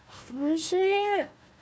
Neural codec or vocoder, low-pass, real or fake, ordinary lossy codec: codec, 16 kHz, 1 kbps, FunCodec, trained on Chinese and English, 50 frames a second; none; fake; none